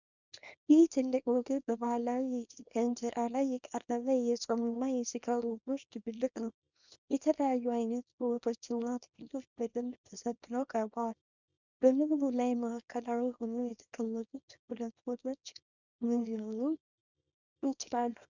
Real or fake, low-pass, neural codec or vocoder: fake; 7.2 kHz; codec, 24 kHz, 0.9 kbps, WavTokenizer, small release